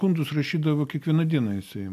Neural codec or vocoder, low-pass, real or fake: none; 14.4 kHz; real